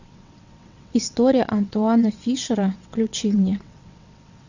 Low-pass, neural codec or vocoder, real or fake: 7.2 kHz; vocoder, 22.05 kHz, 80 mel bands, WaveNeXt; fake